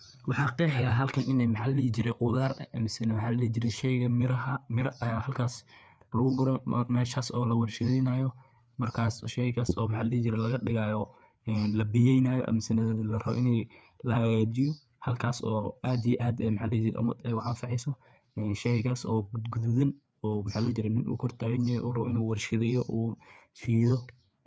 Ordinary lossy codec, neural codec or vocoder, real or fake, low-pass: none; codec, 16 kHz, 4 kbps, FreqCodec, larger model; fake; none